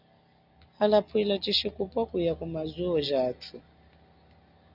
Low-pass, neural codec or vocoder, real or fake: 5.4 kHz; none; real